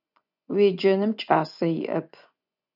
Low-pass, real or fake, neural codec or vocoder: 5.4 kHz; fake; vocoder, 24 kHz, 100 mel bands, Vocos